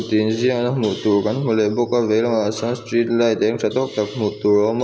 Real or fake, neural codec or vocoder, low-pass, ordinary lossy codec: real; none; none; none